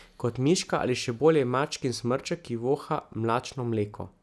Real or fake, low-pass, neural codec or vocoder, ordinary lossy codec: real; none; none; none